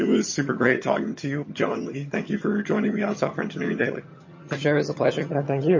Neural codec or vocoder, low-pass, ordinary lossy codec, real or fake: vocoder, 22.05 kHz, 80 mel bands, HiFi-GAN; 7.2 kHz; MP3, 32 kbps; fake